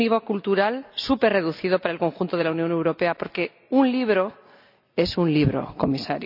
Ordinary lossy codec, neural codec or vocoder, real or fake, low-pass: none; none; real; 5.4 kHz